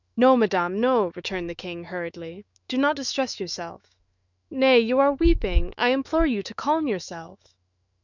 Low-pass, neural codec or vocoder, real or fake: 7.2 kHz; autoencoder, 48 kHz, 128 numbers a frame, DAC-VAE, trained on Japanese speech; fake